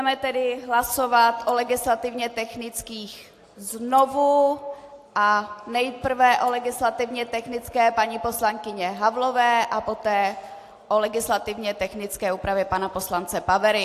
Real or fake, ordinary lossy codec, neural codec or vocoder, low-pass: real; AAC, 64 kbps; none; 14.4 kHz